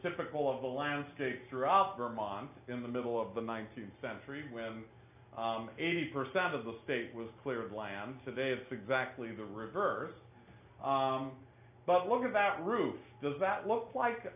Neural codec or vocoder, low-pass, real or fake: none; 3.6 kHz; real